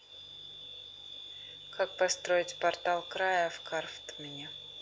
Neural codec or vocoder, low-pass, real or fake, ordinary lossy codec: none; none; real; none